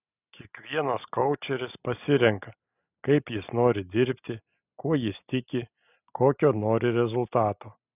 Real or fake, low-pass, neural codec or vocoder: real; 3.6 kHz; none